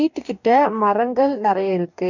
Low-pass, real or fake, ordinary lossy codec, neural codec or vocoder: 7.2 kHz; fake; none; codec, 44.1 kHz, 2.6 kbps, DAC